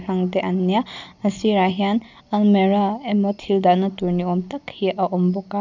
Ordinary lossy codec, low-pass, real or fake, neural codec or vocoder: none; 7.2 kHz; real; none